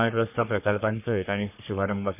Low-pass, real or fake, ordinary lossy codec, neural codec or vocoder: 3.6 kHz; fake; none; codec, 44.1 kHz, 3.4 kbps, Pupu-Codec